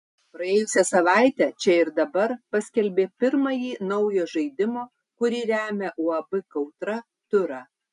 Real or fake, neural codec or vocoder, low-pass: real; none; 10.8 kHz